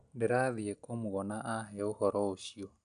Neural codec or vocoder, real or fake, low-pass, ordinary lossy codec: none; real; 9.9 kHz; none